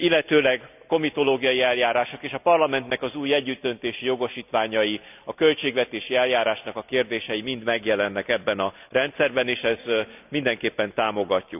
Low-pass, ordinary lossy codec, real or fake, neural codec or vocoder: 3.6 kHz; none; real; none